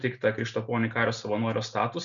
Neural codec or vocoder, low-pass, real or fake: none; 7.2 kHz; real